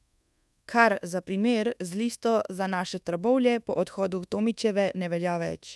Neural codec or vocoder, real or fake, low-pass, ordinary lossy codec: codec, 24 kHz, 1.2 kbps, DualCodec; fake; none; none